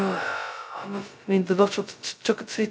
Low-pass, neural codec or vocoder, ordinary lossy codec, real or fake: none; codec, 16 kHz, 0.2 kbps, FocalCodec; none; fake